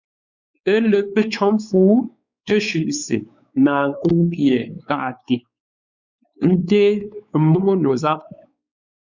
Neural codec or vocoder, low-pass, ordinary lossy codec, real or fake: codec, 16 kHz, 4 kbps, X-Codec, WavLM features, trained on Multilingual LibriSpeech; 7.2 kHz; Opus, 64 kbps; fake